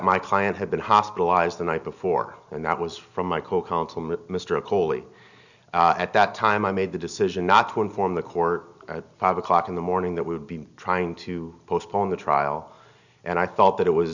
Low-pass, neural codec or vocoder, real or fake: 7.2 kHz; none; real